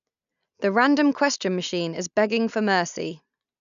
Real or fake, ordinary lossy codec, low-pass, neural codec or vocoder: real; none; 7.2 kHz; none